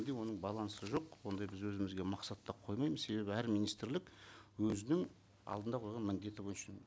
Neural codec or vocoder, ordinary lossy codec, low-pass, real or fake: none; none; none; real